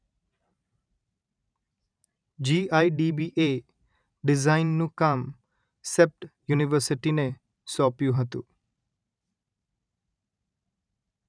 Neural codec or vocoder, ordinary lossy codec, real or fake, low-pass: vocoder, 44.1 kHz, 128 mel bands every 512 samples, BigVGAN v2; none; fake; 9.9 kHz